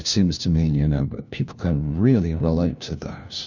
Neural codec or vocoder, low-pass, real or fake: codec, 16 kHz, 1 kbps, FunCodec, trained on LibriTTS, 50 frames a second; 7.2 kHz; fake